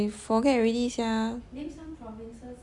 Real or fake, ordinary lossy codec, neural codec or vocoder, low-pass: real; none; none; 10.8 kHz